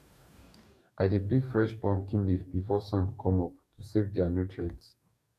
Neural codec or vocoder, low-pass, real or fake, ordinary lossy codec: codec, 44.1 kHz, 2.6 kbps, DAC; 14.4 kHz; fake; none